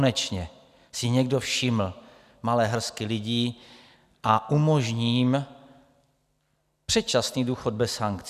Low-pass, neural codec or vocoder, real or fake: 14.4 kHz; none; real